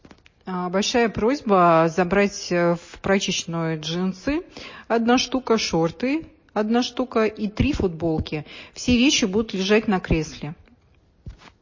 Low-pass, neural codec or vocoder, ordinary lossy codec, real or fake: 7.2 kHz; none; MP3, 32 kbps; real